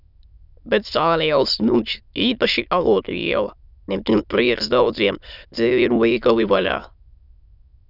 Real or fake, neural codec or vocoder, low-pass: fake; autoencoder, 22.05 kHz, a latent of 192 numbers a frame, VITS, trained on many speakers; 5.4 kHz